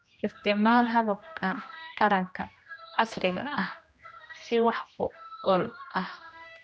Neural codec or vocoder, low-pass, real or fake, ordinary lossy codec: codec, 16 kHz, 1 kbps, X-Codec, HuBERT features, trained on general audio; none; fake; none